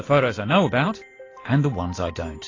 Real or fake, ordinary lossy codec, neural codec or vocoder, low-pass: real; AAC, 32 kbps; none; 7.2 kHz